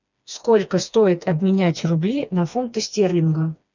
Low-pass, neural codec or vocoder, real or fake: 7.2 kHz; codec, 16 kHz, 2 kbps, FreqCodec, smaller model; fake